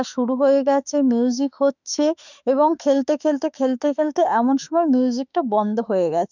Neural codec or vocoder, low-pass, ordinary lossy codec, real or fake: autoencoder, 48 kHz, 32 numbers a frame, DAC-VAE, trained on Japanese speech; 7.2 kHz; none; fake